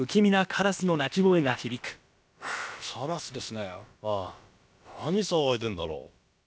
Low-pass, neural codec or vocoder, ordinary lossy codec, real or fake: none; codec, 16 kHz, about 1 kbps, DyCAST, with the encoder's durations; none; fake